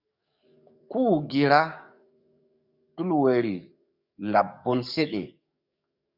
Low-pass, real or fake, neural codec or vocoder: 5.4 kHz; fake; codec, 44.1 kHz, 7.8 kbps, DAC